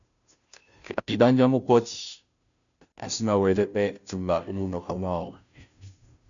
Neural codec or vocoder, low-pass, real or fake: codec, 16 kHz, 0.5 kbps, FunCodec, trained on Chinese and English, 25 frames a second; 7.2 kHz; fake